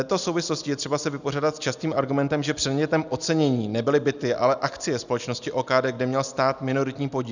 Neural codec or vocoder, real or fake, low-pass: none; real; 7.2 kHz